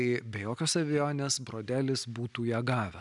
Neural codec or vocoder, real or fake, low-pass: none; real; 10.8 kHz